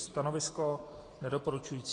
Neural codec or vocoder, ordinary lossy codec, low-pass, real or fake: none; AAC, 32 kbps; 10.8 kHz; real